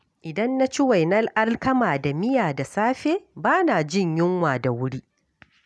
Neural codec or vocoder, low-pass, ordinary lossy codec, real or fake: none; none; none; real